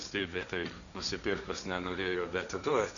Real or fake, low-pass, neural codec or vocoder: fake; 7.2 kHz; codec, 16 kHz, 1.1 kbps, Voila-Tokenizer